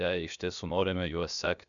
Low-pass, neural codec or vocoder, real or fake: 7.2 kHz; codec, 16 kHz, about 1 kbps, DyCAST, with the encoder's durations; fake